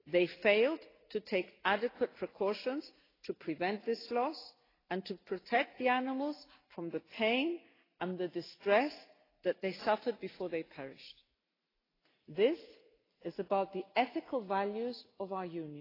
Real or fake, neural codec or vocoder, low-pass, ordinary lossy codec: real; none; 5.4 kHz; AAC, 24 kbps